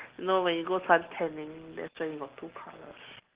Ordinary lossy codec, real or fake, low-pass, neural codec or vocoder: Opus, 16 kbps; real; 3.6 kHz; none